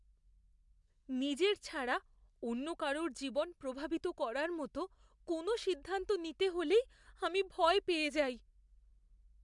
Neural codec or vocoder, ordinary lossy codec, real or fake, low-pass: none; MP3, 96 kbps; real; 10.8 kHz